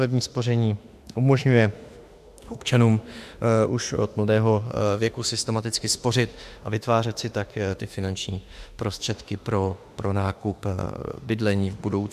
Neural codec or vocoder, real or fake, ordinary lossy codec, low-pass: autoencoder, 48 kHz, 32 numbers a frame, DAC-VAE, trained on Japanese speech; fake; AAC, 96 kbps; 14.4 kHz